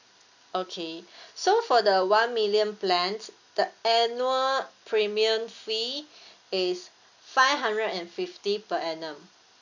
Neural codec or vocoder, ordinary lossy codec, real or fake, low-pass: none; none; real; 7.2 kHz